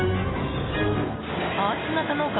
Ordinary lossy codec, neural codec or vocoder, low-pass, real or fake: AAC, 16 kbps; none; 7.2 kHz; real